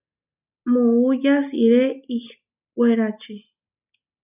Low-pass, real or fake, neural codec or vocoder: 3.6 kHz; real; none